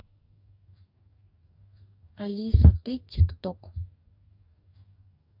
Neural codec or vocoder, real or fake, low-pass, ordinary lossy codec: codec, 44.1 kHz, 2.6 kbps, DAC; fake; 5.4 kHz; AAC, 48 kbps